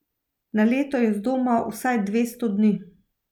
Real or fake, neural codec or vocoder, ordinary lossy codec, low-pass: real; none; none; 19.8 kHz